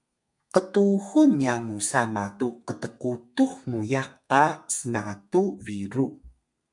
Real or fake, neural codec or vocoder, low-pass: fake; codec, 32 kHz, 1.9 kbps, SNAC; 10.8 kHz